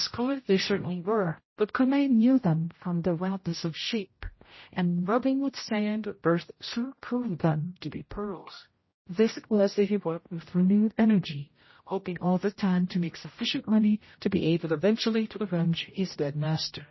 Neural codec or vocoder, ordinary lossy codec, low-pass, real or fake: codec, 16 kHz, 0.5 kbps, X-Codec, HuBERT features, trained on general audio; MP3, 24 kbps; 7.2 kHz; fake